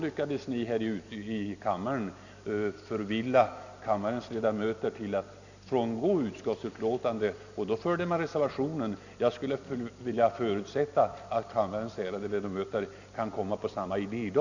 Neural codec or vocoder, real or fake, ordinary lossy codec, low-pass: none; real; none; 7.2 kHz